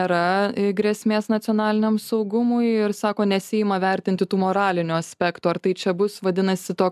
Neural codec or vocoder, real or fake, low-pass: none; real; 14.4 kHz